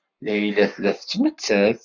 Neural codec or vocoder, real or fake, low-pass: codec, 44.1 kHz, 7.8 kbps, Pupu-Codec; fake; 7.2 kHz